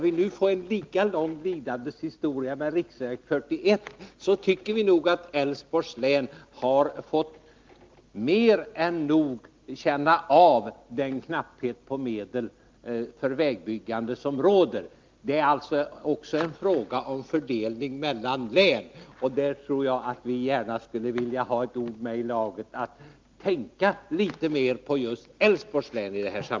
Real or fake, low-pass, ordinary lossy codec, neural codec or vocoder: real; 7.2 kHz; Opus, 32 kbps; none